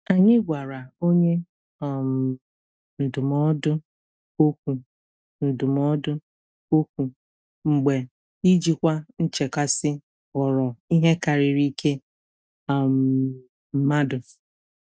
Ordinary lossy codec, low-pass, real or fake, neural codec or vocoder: none; none; real; none